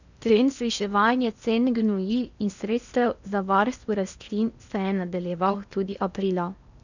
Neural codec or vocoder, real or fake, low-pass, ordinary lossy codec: codec, 16 kHz in and 24 kHz out, 0.8 kbps, FocalCodec, streaming, 65536 codes; fake; 7.2 kHz; none